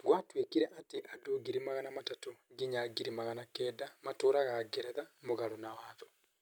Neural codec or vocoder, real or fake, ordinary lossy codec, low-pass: none; real; none; none